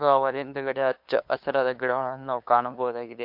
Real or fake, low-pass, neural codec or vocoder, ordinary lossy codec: fake; 5.4 kHz; codec, 16 kHz, 2 kbps, FunCodec, trained on LibriTTS, 25 frames a second; MP3, 48 kbps